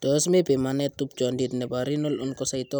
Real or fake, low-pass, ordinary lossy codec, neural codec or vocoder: real; none; none; none